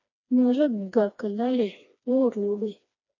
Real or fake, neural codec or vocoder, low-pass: fake; codec, 16 kHz, 2 kbps, FreqCodec, smaller model; 7.2 kHz